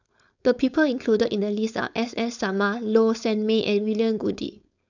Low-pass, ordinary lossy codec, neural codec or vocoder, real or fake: 7.2 kHz; none; codec, 16 kHz, 4.8 kbps, FACodec; fake